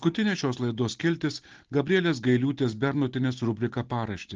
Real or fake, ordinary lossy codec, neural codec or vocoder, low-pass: real; Opus, 16 kbps; none; 7.2 kHz